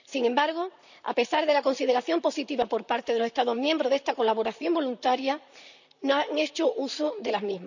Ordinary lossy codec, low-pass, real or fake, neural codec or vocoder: none; 7.2 kHz; fake; vocoder, 44.1 kHz, 128 mel bands, Pupu-Vocoder